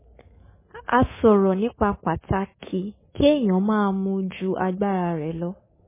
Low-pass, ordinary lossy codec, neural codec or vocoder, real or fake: 3.6 kHz; MP3, 16 kbps; codec, 24 kHz, 3.1 kbps, DualCodec; fake